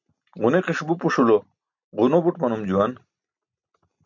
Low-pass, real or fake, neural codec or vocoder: 7.2 kHz; real; none